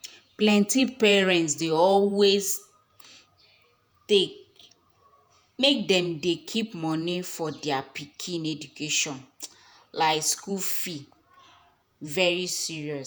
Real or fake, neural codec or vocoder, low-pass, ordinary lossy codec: fake; vocoder, 48 kHz, 128 mel bands, Vocos; none; none